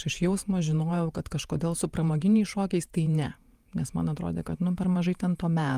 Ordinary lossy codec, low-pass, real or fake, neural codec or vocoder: Opus, 32 kbps; 14.4 kHz; fake; vocoder, 48 kHz, 128 mel bands, Vocos